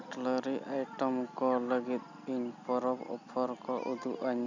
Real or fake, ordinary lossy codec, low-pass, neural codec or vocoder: real; none; 7.2 kHz; none